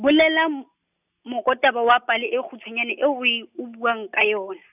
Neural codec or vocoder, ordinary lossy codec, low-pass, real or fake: none; none; 3.6 kHz; real